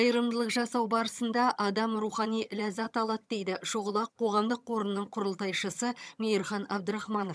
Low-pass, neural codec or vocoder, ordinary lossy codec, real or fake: none; vocoder, 22.05 kHz, 80 mel bands, HiFi-GAN; none; fake